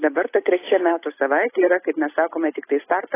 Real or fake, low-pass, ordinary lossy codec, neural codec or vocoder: real; 3.6 kHz; AAC, 16 kbps; none